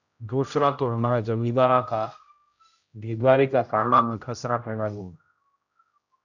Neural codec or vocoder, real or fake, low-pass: codec, 16 kHz, 0.5 kbps, X-Codec, HuBERT features, trained on general audio; fake; 7.2 kHz